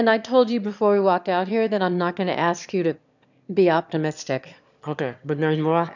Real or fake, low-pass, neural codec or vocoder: fake; 7.2 kHz; autoencoder, 22.05 kHz, a latent of 192 numbers a frame, VITS, trained on one speaker